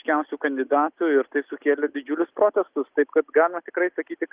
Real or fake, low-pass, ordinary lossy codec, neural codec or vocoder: real; 3.6 kHz; Opus, 32 kbps; none